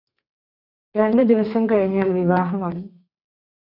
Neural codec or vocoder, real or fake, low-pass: codec, 32 kHz, 1.9 kbps, SNAC; fake; 5.4 kHz